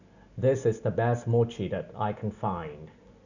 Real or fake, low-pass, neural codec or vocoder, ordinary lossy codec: real; 7.2 kHz; none; none